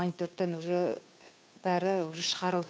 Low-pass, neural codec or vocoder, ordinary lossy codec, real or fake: none; codec, 16 kHz, 2 kbps, FunCodec, trained on Chinese and English, 25 frames a second; none; fake